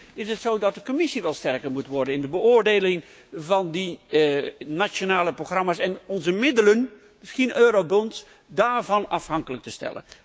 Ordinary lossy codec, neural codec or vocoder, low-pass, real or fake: none; codec, 16 kHz, 6 kbps, DAC; none; fake